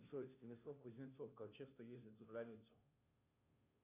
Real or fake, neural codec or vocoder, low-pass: fake; codec, 16 kHz, 0.5 kbps, FunCodec, trained on Chinese and English, 25 frames a second; 3.6 kHz